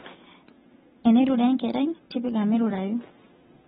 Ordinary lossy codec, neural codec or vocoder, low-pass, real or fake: AAC, 16 kbps; none; 7.2 kHz; real